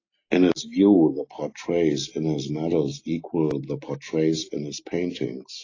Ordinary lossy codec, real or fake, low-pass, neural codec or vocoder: AAC, 32 kbps; real; 7.2 kHz; none